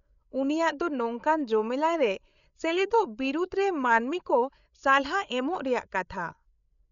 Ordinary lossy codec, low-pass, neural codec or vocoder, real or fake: MP3, 96 kbps; 7.2 kHz; codec, 16 kHz, 8 kbps, FreqCodec, larger model; fake